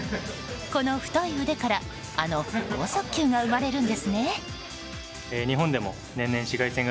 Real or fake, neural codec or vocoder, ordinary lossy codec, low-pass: real; none; none; none